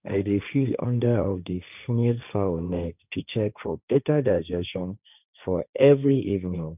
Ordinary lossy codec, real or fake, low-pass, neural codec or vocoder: none; fake; 3.6 kHz; codec, 16 kHz, 1.1 kbps, Voila-Tokenizer